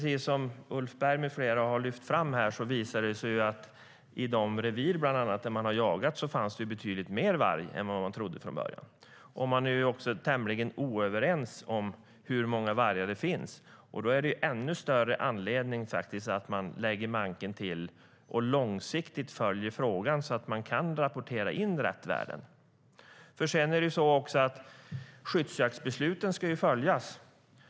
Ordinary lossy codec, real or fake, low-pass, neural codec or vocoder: none; real; none; none